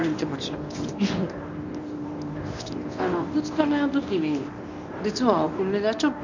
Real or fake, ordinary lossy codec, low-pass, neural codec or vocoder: fake; none; 7.2 kHz; codec, 24 kHz, 0.9 kbps, WavTokenizer, medium speech release version 1